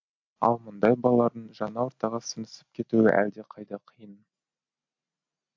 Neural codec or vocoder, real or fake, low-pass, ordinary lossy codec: none; real; 7.2 kHz; MP3, 48 kbps